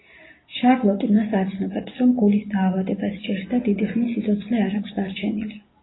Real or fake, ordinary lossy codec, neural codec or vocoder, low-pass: real; AAC, 16 kbps; none; 7.2 kHz